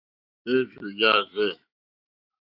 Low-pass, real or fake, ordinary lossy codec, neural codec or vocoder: 5.4 kHz; fake; AAC, 48 kbps; autoencoder, 48 kHz, 128 numbers a frame, DAC-VAE, trained on Japanese speech